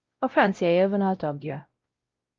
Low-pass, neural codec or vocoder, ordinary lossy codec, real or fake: 7.2 kHz; codec, 16 kHz, 0.5 kbps, X-Codec, WavLM features, trained on Multilingual LibriSpeech; Opus, 32 kbps; fake